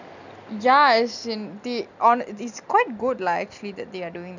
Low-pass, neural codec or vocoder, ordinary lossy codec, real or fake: 7.2 kHz; none; none; real